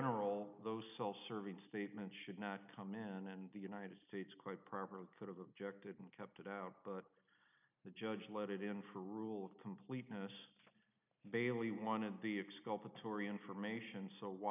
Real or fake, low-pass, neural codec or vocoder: real; 3.6 kHz; none